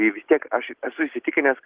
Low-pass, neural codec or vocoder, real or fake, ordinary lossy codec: 3.6 kHz; none; real; Opus, 16 kbps